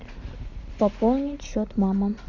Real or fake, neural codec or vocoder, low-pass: real; none; 7.2 kHz